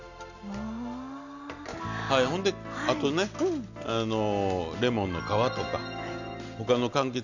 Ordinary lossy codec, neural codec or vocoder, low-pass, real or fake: none; none; 7.2 kHz; real